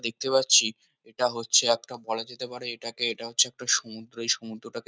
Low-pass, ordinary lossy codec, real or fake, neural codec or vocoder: none; none; real; none